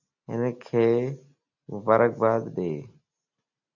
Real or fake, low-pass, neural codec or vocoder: real; 7.2 kHz; none